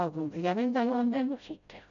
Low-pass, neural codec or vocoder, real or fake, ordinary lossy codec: 7.2 kHz; codec, 16 kHz, 0.5 kbps, FreqCodec, smaller model; fake; none